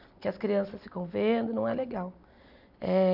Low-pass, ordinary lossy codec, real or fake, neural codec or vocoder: 5.4 kHz; none; real; none